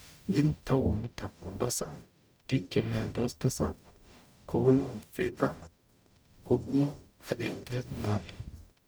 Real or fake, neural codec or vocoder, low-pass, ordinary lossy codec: fake; codec, 44.1 kHz, 0.9 kbps, DAC; none; none